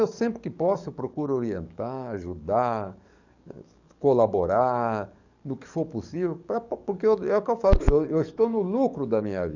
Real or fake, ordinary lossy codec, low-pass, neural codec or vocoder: fake; none; 7.2 kHz; codec, 44.1 kHz, 7.8 kbps, DAC